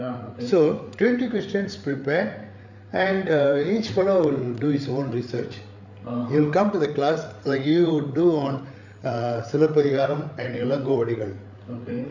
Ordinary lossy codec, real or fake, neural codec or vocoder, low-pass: none; fake; codec, 16 kHz, 8 kbps, FreqCodec, larger model; 7.2 kHz